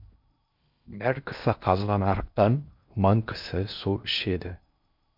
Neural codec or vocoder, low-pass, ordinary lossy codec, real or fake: codec, 16 kHz in and 24 kHz out, 0.6 kbps, FocalCodec, streaming, 4096 codes; 5.4 kHz; MP3, 48 kbps; fake